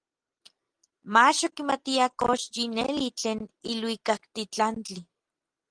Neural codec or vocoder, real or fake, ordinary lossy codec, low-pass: none; real; Opus, 24 kbps; 9.9 kHz